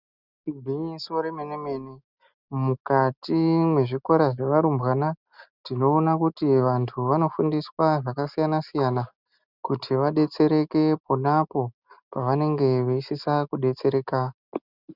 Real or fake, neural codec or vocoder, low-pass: real; none; 5.4 kHz